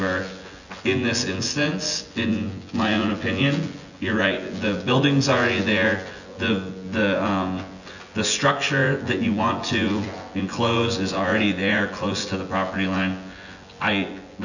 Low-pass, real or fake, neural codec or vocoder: 7.2 kHz; fake; vocoder, 24 kHz, 100 mel bands, Vocos